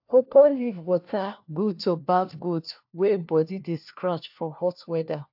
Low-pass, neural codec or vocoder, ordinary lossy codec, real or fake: 5.4 kHz; codec, 16 kHz, 1 kbps, FunCodec, trained on LibriTTS, 50 frames a second; AAC, 48 kbps; fake